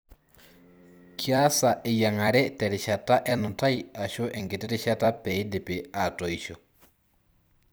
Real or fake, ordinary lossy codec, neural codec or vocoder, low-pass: fake; none; vocoder, 44.1 kHz, 128 mel bands every 256 samples, BigVGAN v2; none